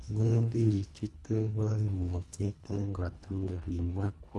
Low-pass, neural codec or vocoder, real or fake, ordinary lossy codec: none; codec, 24 kHz, 1.5 kbps, HILCodec; fake; none